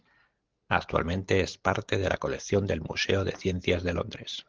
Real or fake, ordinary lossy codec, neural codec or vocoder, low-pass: real; Opus, 16 kbps; none; 7.2 kHz